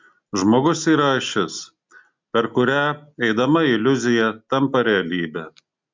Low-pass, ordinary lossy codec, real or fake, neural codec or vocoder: 7.2 kHz; MP3, 64 kbps; real; none